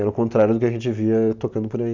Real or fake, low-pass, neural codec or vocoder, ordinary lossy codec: real; 7.2 kHz; none; none